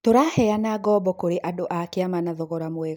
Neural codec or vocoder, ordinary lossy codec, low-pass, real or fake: none; none; none; real